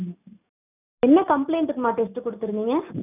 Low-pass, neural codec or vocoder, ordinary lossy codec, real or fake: 3.6 kHz; none; none; real